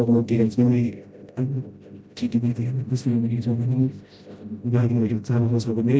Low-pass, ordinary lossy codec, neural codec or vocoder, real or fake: none; none; codec, 16 kHz, 0.5 kbps, FreqCodec, smaller model; fake